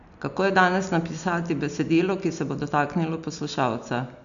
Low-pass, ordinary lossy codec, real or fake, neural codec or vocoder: 7.2 kHz; none; real; none